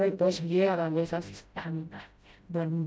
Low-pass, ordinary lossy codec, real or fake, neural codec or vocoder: none; none; fake; codec, 16 kHz, 0.5 kbps, FreqCodec, smaller model